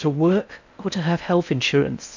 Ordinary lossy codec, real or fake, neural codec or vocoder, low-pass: MP3, 64 kbps; fake; codec, 16 kHz in and 24 kHz out, 0.6 kbps, FocalCodec, streaming, 4096 codes; 7.2 kHz